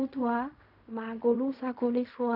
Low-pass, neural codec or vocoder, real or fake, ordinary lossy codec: 5.4 kHz; codec, 16 kHz in and 24 kHz out, 0.4 kbps, LongCat-Audio-Codec, fine tuned four codebook decoder; fake; none